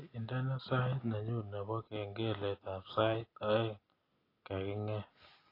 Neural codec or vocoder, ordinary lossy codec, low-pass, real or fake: none; AAC, 24 kbps; 5.4 kHz; real